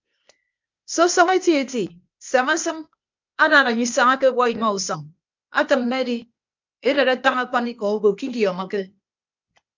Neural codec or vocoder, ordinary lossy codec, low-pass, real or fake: codec, 16 kHz, 0.8 kbps, ZipCodec; MP3, 64 kbps; 7.2 kHz; fake